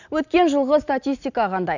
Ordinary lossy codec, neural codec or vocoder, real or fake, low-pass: none; none; real; 7.2 kHz